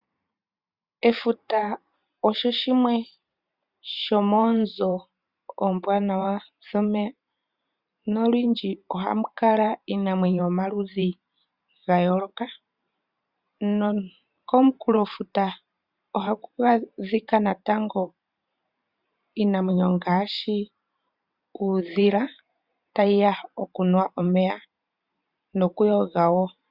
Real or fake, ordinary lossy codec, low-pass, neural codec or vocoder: fake; Opus, 64 kbps; 5.4 kHz; vocoder, 44.1 kHz, 128 mel bands every 512 samples, BigVGAN v2